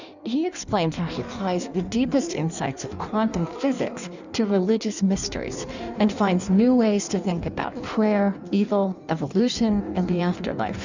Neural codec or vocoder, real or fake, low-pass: codec, 16 kHz in and 24 kHz out, 1.1 kbps, FireRedTTS-2 codec; fake; 7.2 kHz